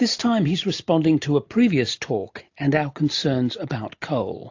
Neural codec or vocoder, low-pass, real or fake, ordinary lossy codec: none; 7.2 kHz; real; AAC, 48 kbps